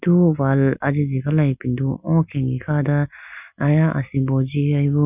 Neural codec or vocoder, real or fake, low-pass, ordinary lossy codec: none; real; 3.6 kHz; none